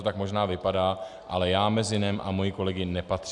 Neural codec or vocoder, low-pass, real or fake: none; 10.8 kHz; real